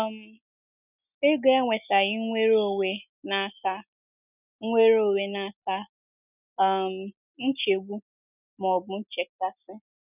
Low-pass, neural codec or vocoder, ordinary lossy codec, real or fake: 3.6 kHz; none; none; real